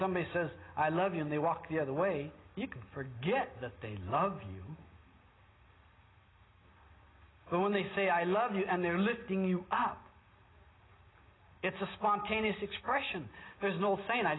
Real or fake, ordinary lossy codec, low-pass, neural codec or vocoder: real; AAC, 16 kbps; 7.2 kHz; none